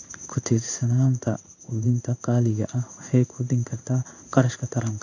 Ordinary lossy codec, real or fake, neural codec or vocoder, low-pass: none; fake; codec, 16 kHz in and 24 kHz out, 1 kbps, XY-Tokenizer; 7.2 kHz